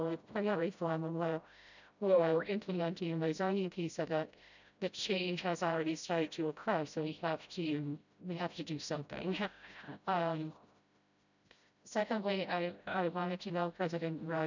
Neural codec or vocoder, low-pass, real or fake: codec, 16 kHz, 0.5 kbps, FreqCodec, smaller model; 7.2 kHz; fake